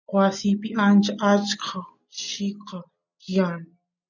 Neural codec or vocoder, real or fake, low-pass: none; real; 7.2 kHz